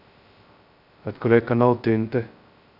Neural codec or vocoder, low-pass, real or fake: codec, 16 kHz, 0.2 kbps, FocalCodec; 5.4 kHz; fake